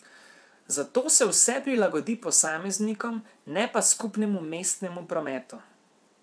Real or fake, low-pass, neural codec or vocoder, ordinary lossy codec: fake; none; vocoder, 22.05 kHz, 80 mel bands, WaveNeXt; none